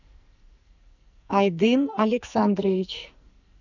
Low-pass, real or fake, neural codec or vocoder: 7.2 kHz; fake; codec, 44.1 kHz, 2.6 kbps, SNAC